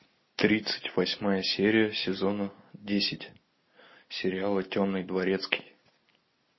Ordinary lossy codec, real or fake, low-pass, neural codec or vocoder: MP3, 24 kbps; real; 7.2 kHz; none